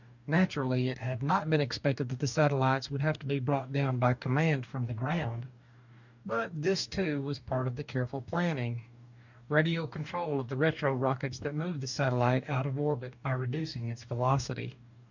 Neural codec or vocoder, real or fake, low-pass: codec, 44.1 kHz, 2.6 kbps, DAC; fake; 7.2 kHz